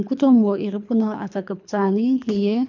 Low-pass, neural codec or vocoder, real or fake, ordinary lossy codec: 7.2 kHz; codec, 24 kHz, 3 kbps, HILCodec; fake; none